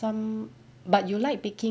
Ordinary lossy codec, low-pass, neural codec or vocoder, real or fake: none; none; none; real